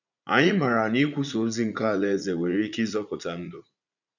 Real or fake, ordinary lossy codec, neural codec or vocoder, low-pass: fake; none; vocoder, 44.1 kHz, 80 mel bands, Vocos; 7.2 kHz